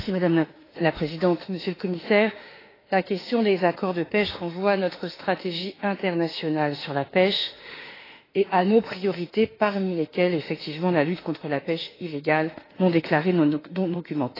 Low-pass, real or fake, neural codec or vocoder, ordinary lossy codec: 5.4 kHz; fake; autoencoder, 48 kHz, 32 numbers a frame, DAC-VAE, trained on Japanese speech; AAC, 24 kbps